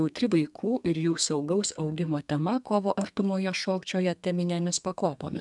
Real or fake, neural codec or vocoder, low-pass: fake; codec, 32 kHz, 1.9 kbps, SNAC; 10.8 kHz